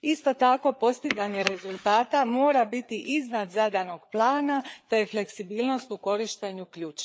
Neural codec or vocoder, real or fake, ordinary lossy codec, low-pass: codec, 16 kHz, 4 kbps, FreqCodec, larger model; fake; none; none